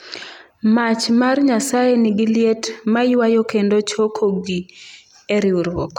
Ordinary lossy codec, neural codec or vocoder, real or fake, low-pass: none; none; real; 19.8 kHz